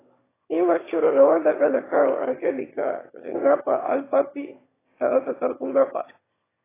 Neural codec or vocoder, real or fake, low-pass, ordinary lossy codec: autoencoder, 22.05 kHz, a latent of 192 numbers a frame, VITS, trained on one speaker; fake; 3.6 kHz; AAC, 16 kbps